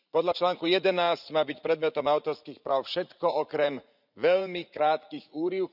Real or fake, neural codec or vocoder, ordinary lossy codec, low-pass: fake; vocoder, 44.1 kHz, 80 mel bands, Vocos; none; 5.4 kHz